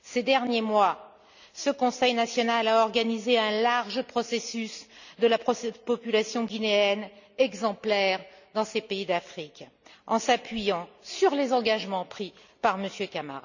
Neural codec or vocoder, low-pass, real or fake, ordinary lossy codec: none; 7.2 kHz; real; none